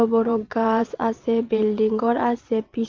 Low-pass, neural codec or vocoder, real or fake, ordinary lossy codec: 7.2 kHz; vocoder, 22.05 kHz, 80 mel bands, WaveNeXt; fake; Opus, 24 kbps